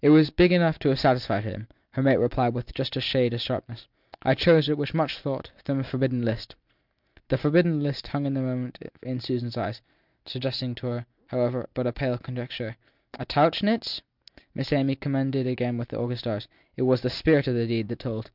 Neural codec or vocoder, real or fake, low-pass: none; real; 5.4 kHz